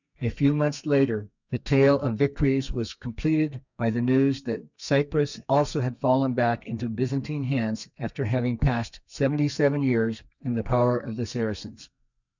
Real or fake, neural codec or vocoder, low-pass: fake; codec, 32 kHz, 1.9 kbps, SNAC; 7.2 kHz